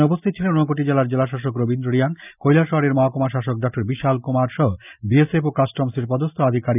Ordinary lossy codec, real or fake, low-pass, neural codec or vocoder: none; real; 3.6 kHz; none